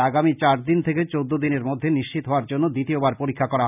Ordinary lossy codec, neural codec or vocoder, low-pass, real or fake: none; none; 3.6 kHz; real